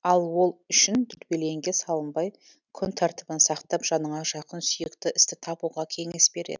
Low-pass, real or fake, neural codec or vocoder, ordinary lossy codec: 7.2 kHz; real; none; none